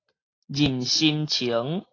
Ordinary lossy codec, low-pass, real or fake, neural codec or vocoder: AAC, 32 kbps; 7.2 kHz; real; none